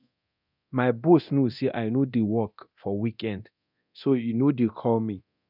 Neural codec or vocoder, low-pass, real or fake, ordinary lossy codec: codec, 24 kHz, 0.9 kbps, DualCodec; 5.4 kHz; fake; none